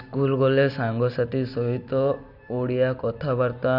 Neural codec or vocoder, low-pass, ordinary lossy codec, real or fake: none; 5.4 kHz; none; real